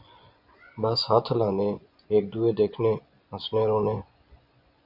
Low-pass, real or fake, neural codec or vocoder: 5.4 kHz; fake; vocoder, 24 kHz, 100 mel bands, Vocos